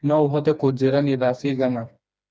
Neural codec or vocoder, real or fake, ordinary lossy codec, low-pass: codec, 16 kHz, 2 kbps, FreqCodec, smaller model; fake; none; none